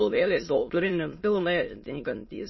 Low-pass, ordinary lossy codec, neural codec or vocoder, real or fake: 7.2 kHz; MP3, 24 kbps; autoencoder, 22.05 kHz, a latent of 192 numbers a frame, VITS, trained on many speakers; fake